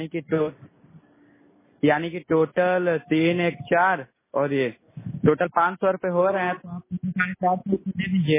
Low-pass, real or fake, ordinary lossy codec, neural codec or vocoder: 3.6 kHz; real; MP3, 16 kbps; none